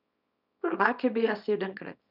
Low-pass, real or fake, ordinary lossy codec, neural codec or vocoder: 5.4 kHz; fake; none; codec, 24 kHz, 0.9 kbps, WavTokenizer, small release